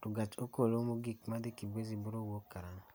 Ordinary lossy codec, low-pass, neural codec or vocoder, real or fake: none; none; none; real